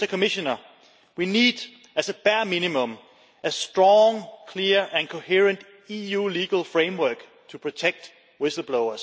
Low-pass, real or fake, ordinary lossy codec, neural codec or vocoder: none; real; none; none